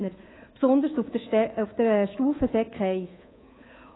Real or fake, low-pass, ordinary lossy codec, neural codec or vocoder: fake; 7.2 kHz; AAC, 16 kbps; codec, 24 kHz, 3.1 kbps, DualCodec